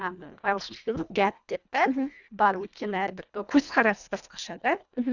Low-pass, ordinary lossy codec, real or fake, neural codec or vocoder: 7.2 kHz; none; fake; codec, 24 kHz, 1.5 kbps, HILCodec